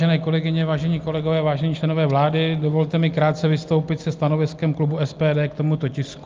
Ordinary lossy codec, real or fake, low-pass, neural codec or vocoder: Opus, 32 kbps; real; 7.2 kHz; none